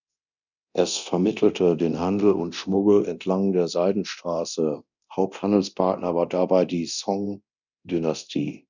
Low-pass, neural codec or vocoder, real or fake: 7.2 kHz; codec, 24 kHz, 0.9 kbps, DualCodec; fake